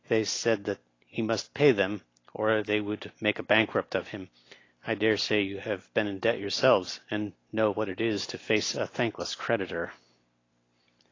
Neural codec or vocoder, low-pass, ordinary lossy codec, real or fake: none; 7.2 kHz; AAC, 32 kbps; real